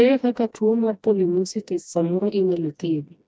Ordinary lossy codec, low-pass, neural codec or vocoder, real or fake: none; none; codec, 16 kHz, 1 kbps, FreqCodec, smaller model; fake